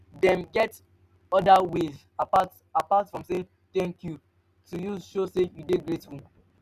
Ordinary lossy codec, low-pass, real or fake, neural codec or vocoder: none; 14.4 kHz; real; none